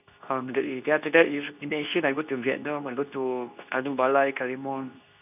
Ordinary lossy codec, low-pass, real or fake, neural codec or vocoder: none; 3.6 kHz; fake; codec, 24 kHz, 0.9 kbps, WavTokenizer, medium speech release version 2